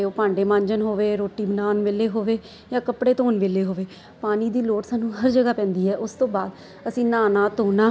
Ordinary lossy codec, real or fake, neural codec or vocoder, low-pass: none; real; none; none